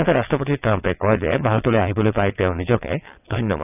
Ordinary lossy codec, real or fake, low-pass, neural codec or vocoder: none; fake; 3.6 kHz; vocoder, 22.05 kHz, 80 mel bands, WaveNeXt